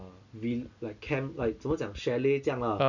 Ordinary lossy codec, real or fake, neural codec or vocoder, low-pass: none; real; none; 7.2 kHz